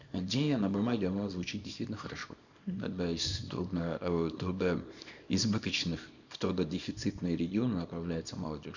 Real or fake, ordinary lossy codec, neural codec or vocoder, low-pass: fake; none; codec, 24 kHz, 0.9 kbps, WavTokenizer, small release; 7.2 kHz